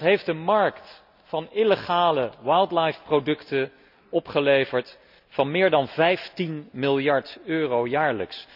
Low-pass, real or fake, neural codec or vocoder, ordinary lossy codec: 5.4 kHz; real; none; none